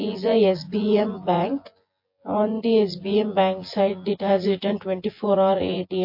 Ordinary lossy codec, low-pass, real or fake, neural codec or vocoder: MP3, 32 kbps; 5.4 kHz; fake; vocoder, 24 kHz, 100 mel bands, Vocos